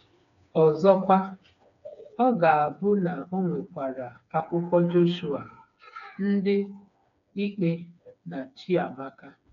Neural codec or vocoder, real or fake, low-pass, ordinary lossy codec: codec, 16 kHz, 4 kbps, FreqCodec, smaller model; fake; 7.2 kHz; none